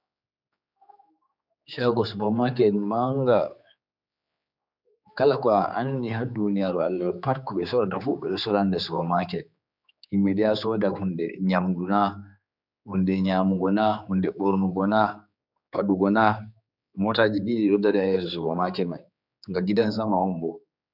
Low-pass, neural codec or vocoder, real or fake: 5.4 kHz; codec, 16 kHz, 4 kbps, X-Codec, HuBERT features, trained on general audio; fake